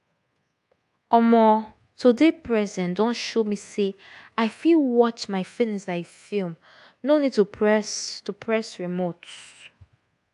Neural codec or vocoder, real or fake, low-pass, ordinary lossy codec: codec, 24 kHz, 1.2 kbps, DualCodec; fake; 10.8 kHz; AAC, 64 kbps